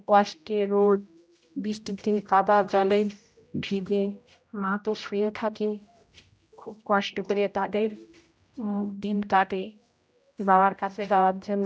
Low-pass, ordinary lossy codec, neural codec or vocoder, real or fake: none; none; codec, 16 kHz, 0.5 kbps, X-Codec, HuBERT features, trained on general audio; fake